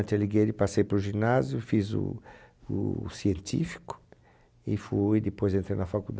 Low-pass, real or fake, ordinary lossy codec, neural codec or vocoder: none; real; none; none